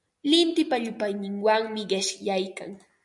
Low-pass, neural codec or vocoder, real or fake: 10.8 kHz; none; real